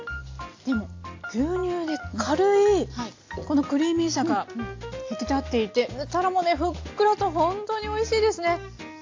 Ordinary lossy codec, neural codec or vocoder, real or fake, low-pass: AAC, 48 kbps; none; real; 7.2 kHz